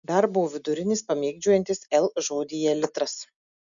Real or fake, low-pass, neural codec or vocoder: real; 7.2 kHz; none